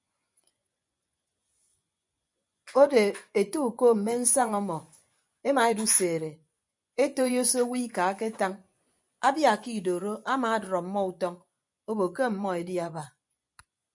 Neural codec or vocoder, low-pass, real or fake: vocoder, 24 kHz, 100 mel bands, Vocos; 10.8 kHz; fake